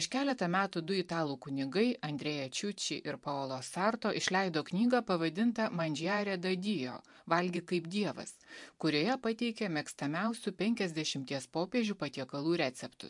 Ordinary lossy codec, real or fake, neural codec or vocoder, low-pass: MP3, 64 kbps; fake; vocoder, 44.1 kHz, 128 mel bands every 512 samples, BigVGAN v2; 10.8 kHz